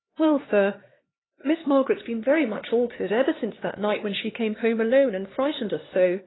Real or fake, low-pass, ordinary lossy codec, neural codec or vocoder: fake; 7.2 kHz; AAC, 16 kbps; codec, 16 kHz, 2 kbps, X-Codec, HuBERT features, trained on LibriSpeech